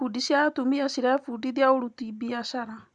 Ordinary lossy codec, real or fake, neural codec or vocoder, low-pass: Opus, 64 kbps; real; none; 10.8 kHz